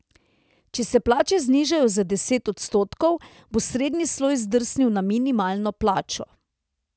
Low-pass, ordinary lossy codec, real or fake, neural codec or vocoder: none; none; real; none